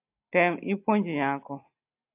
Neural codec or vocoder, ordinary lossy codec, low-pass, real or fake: none; AAC, 32 kbps; 3.6 kHz; real